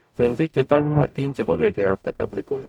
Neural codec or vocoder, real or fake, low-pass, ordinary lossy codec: codec, 44.1 kHz, 0.9 kbps, DAC; fake; 19.8 kHz; none